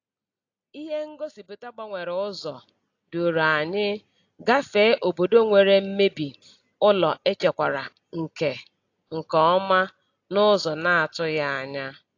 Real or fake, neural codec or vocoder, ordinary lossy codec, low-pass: real; none; AAC, 48 kbps; 7.2 kHz